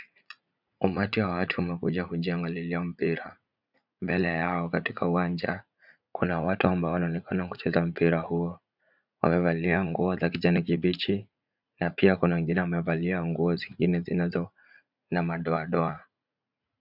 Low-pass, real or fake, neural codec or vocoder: 5.4 kHz; fake; vocoder, 44.1 kHz, 80 mel bands, Vocos